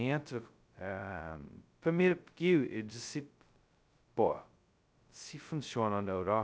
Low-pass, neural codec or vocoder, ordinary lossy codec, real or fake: none; codec, 16 kHz, 0.2 kbps, FocalCodec; none; fake